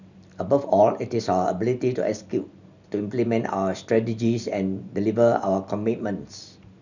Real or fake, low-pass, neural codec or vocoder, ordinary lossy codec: real; 7.2 kHz; none; none